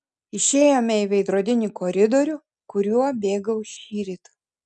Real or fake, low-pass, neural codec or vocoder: real; 10.8 kHz; none